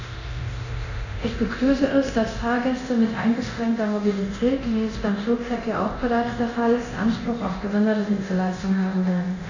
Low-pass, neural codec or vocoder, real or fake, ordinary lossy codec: 7.2 kHz; codec, 24 kHz, 0.9 kbps, DualCodec; fake; none